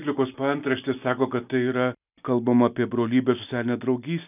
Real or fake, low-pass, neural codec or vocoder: real; 3.6 kHz; none